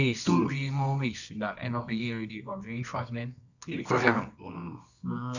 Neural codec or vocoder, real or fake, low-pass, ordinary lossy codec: codec, 24 kHz, 0.9 kbps, WavTokenizer, medium music audio release; fake; 7.2 kHz; none